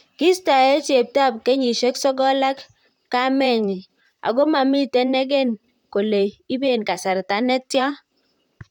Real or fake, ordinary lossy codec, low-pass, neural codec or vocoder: fake; none; 19.8 kHz; vocoder, 44.1 kHz, 128 mel bands, Pupu-Vocoder